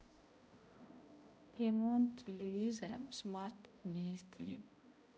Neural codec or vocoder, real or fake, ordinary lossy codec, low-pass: codec, 16 kHz, 0.5 kbps, X-Codec, HuBERT features, trained on balanced general audio; fake; none; none